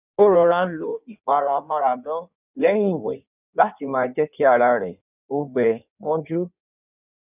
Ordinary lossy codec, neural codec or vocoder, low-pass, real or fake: none; codec, 16 kHz in and 24 kHz out, 1.1 kbps, FireRedTTS-2 codec; 3.6 kHz; fake